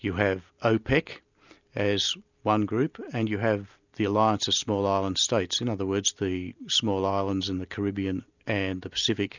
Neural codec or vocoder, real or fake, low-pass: none; real; 7.2 kHz